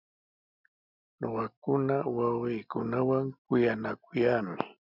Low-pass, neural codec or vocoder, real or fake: 5.4 kHz; none; real